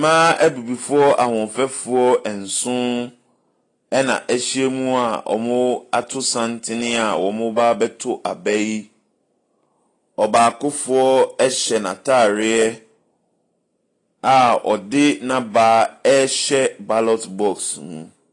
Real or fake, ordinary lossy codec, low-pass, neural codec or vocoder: real; AAC, 32 kbps; 10.8 kHz; none